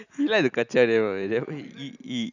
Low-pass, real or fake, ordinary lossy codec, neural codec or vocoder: 7.2 kHz; real; none; none